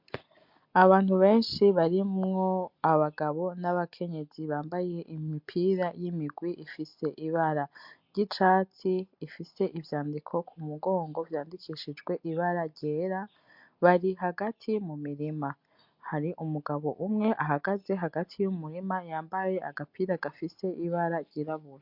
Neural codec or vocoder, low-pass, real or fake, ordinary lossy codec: none; 5.4 kHz; real; MP3, 48 kbps